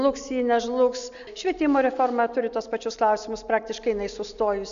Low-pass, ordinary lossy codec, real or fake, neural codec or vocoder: 7.2 kHz; MP3, 96 kbps; real; none